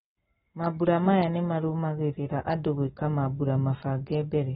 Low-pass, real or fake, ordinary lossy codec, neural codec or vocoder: 19.8 kHz; real; AAC, 16 kbps; none